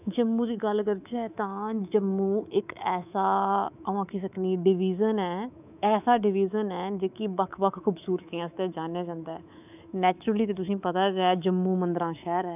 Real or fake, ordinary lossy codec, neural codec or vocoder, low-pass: fake; none; codec, 24 kHz, 3.1 kbps, DualCodec; 3.6 kHz